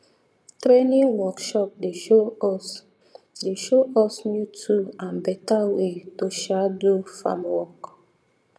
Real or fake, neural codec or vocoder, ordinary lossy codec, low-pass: fake; vocoder, 22.05 kHz, 80 mel bands, Vocos; none; none